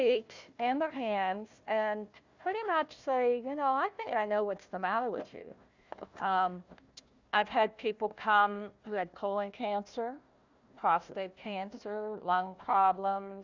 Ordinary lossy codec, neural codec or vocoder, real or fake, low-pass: Opus, 64 kbps; codec, 16 kHz, 1 kbps, FunCodec, trained on Chinese and English, 50 frames a second; fake; 7.2 kHz